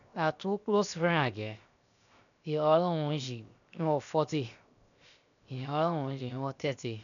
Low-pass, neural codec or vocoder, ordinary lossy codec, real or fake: 7.2 kHz; codec, 16 kHz, 0.7 kbps, FocalCodec; none; fake